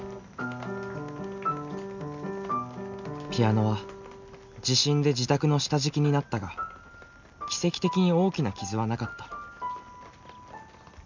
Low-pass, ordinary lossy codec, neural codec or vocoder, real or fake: 7.2 kHz; none; none; real